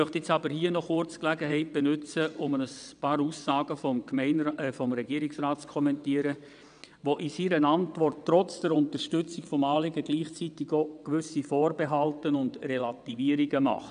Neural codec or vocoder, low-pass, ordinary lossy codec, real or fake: vocoder, 22.05 kHz, 80 mel bands, WaveNeXt; 9.9 kHz; none; fake